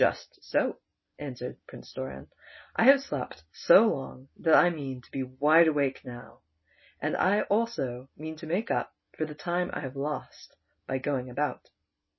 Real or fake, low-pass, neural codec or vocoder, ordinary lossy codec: real; 7.2 kHz; none; MP3, 24 kbps